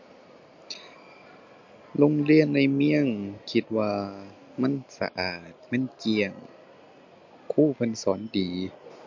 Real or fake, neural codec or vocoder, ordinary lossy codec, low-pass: real; none; MP3, 48 kbps; 7.2 kHz